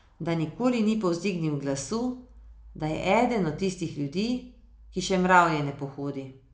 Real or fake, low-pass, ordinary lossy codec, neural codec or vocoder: real; none; none; none